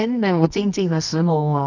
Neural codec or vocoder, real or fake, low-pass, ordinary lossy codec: codec, 24 kHz, 0.9 kbps, WavTokenizer, medium music audio release; fake; 7.2 kHz; none